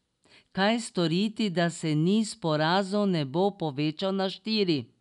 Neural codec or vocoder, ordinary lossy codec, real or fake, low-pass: none; none; real; 10.8 kHz